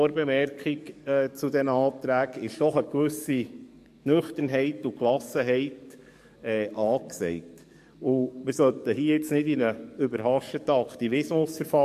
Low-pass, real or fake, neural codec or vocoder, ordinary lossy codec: 14.4 kHz; fake; codec, 44.1 kHz, 7.8 kbps, DAC; MP3, 64 kbps